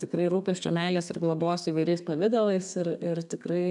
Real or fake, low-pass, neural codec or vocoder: fake; 10.8 kHz; codec, 32 kHz, 1.9 kbps, SNAC